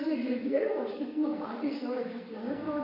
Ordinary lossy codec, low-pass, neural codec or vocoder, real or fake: MP3, 32 kbps; 5.4 kHz; codec, 44.1 kHz, 2.6 kbps, SNAC; fake